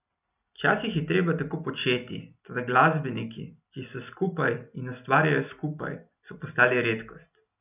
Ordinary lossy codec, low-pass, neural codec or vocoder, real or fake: none; 3.6 kHz; none; real